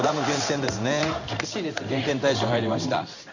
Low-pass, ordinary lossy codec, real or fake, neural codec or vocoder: 7.2 kHz; none; fake; codec, 16 kHz in and 24 kHz out, 1 kbps, XY-Tokenizer